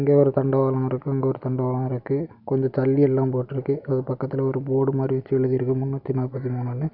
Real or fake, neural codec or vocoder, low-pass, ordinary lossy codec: real; none; 5.4 kHz; none